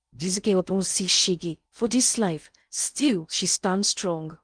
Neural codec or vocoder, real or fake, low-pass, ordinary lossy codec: codec, 16 kHz in and 24 kHz out, 0.6 kbps, FocalCodec, streaming, 2048 codes; fake; 9.9 kHz; Opus, 32 kbps